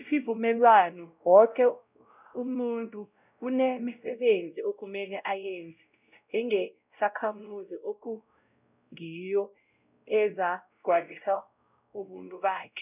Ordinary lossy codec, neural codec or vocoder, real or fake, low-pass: none; codec, 16 kHz, 0.5 kbps, X-Codec, WavLM features, trained on Multilingual LibriSpeech; fake; 3.6 kHz